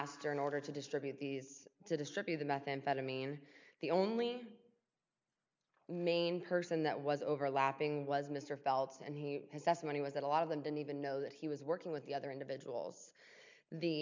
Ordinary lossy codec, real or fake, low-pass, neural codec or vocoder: MP3, 64 kbps; real; 7.2 kHz; none